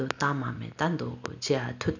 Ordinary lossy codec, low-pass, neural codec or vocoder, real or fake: none; 7.2 kHz; none; real